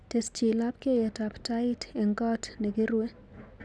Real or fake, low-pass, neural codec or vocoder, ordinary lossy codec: real; none; none; none